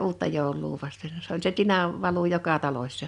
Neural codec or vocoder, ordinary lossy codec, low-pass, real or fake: none; none; 10.8 kHz; real